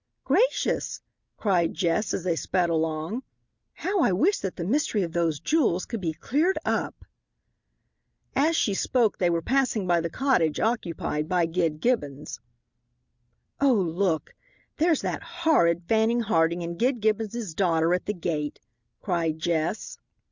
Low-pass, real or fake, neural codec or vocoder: 7.2 kHz; real; none